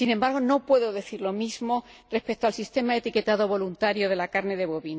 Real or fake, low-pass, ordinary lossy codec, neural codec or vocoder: real; none; none; none